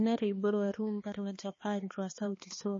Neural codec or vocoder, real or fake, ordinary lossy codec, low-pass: codec, 16 kHz, 2 kbps, X-Codec, HuBERT features, trained on balanced general audio; fake; MP3, 32 kbps; 7.2 kHz